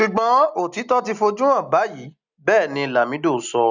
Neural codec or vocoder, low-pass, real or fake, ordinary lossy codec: none; 7.2 kHz; real; none